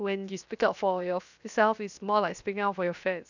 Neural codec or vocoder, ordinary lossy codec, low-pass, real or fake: codec, 16 kHz, 0.7 kbps, FocalCodec; none; 7.2 kHz; fake